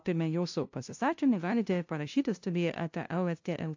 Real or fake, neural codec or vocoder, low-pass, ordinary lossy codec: fake; codec, 16 kHz, 0.5 kbps, FunCodec, trained on LibriTTS, 25 frames a second; 7.2 kHz; AAC, 48 kbps